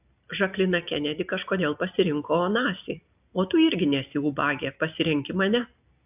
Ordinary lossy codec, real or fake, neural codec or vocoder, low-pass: AAC, 32 kbps; real; none; 3.6 kHz